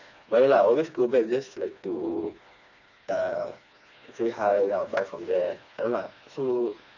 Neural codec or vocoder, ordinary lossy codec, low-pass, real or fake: codec, 16 kHz, 2 kbps, FreqCodec, smaller model; none; 7.2 kHz; fake